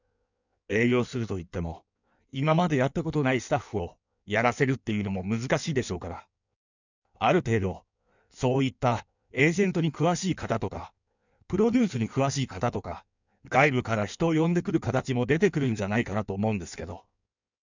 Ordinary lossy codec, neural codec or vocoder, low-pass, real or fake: none; codec, 16 kHz in and 24 kHz out, 1.1 kbps, FireRedTTS-2 codec; 7.2 kHz; fake